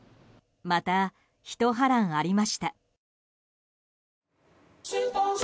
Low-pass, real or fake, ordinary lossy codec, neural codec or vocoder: none; real; none; none